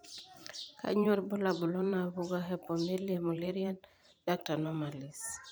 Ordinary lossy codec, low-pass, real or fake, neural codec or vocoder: none; none; fake; vocoder, 44.1 kHz, 128 mel bands every 512 samples, BigVGAN v2